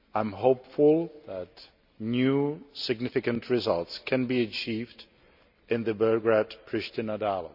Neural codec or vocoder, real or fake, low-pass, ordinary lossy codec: none; real; 5.4 kHz; none